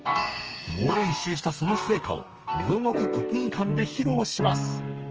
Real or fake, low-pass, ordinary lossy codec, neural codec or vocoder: fake; 7.2 kHz; Opus, 24 kbps; codec, 32 kHz, 1.9 kbps, SNAC